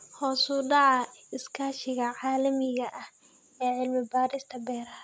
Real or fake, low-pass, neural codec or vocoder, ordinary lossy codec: real; none; none; none